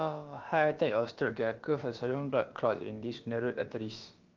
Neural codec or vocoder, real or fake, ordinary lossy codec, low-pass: codec, 16 kHz, about 1 kbps, DyCAST, with the encoder's durations; fake; Opus, 32 kbps; 7.2 kHz